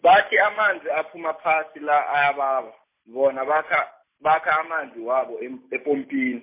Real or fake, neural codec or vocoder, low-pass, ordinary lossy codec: real; none; 3.6 kHz; MP3, 24 kbps